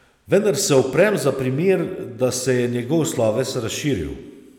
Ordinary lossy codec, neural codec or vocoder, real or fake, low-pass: none; none; real; 19.8 kHz